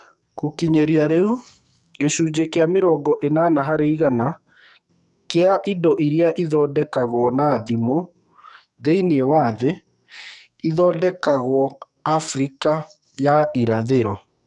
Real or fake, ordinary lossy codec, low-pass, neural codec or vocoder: fake; none; 10.8 kHz; codec, 44.1 kHz, 2.6 kbps, SNAC